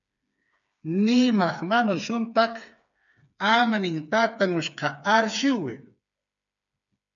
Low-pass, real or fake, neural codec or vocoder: 7.2 kHz; fake; codec, 16 kHz, 4 kbps, FreqCodec, smaller model